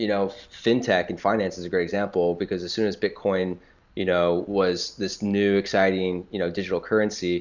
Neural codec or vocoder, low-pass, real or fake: none; 7.2 kHz; real